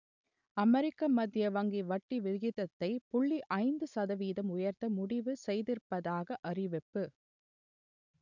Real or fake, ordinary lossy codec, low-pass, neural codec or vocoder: real; none; 7.2 kHz; none